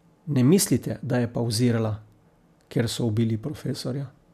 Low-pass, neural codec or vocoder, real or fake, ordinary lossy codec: 14.4 kHz; none; real; none